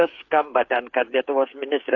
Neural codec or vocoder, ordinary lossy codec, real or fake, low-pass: codec, 16 kHz, 8 kbps, FreqCodec, smaller model; AAC, 48 kbps; fake; 7.2 kHz